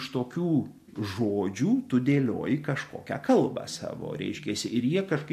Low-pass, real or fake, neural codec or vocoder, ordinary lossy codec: 14.4 kHz; real; none; MP3, 64 kbps